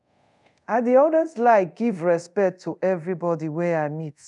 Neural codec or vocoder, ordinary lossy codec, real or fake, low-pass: codec, 24 kHz, 0.5 kbps, DualCodec; none; fake; 10.8 kHz